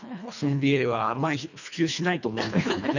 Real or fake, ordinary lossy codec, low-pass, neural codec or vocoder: fake; none; 7.2 kHz; codec, 24 kHz, 1.5 kbps, HILCodec